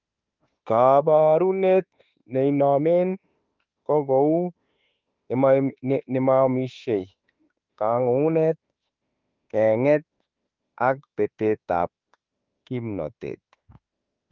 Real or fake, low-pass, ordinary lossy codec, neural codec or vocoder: fake; 7.2 kHz; Opus, 32 kbps; autoencoder, 48 kHz, 32 numbers a frame, DAC-VAE, trained on Japanese speech